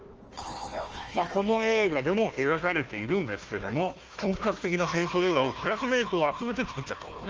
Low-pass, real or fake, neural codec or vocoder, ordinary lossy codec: 7.2 kHz; fake; codec, 16 kHz, 1 kbps, FunCodec, trained on Chinese and English, 50 frames a second; Opus, 24 kbps